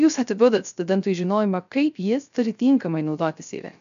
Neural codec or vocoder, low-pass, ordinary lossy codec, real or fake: codec, 16 kHz, 0.3 kbps, FocalCodec; 7.2 kHz; AAC, 96 kbps; fake